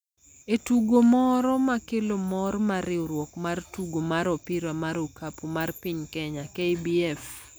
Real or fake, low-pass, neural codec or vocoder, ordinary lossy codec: real; none; none; none